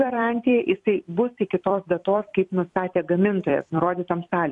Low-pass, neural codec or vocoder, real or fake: 10.8 kHz; vocoder, 44.1 kHz, 128 mel bands every 256 samples, BigVGAN v2; fake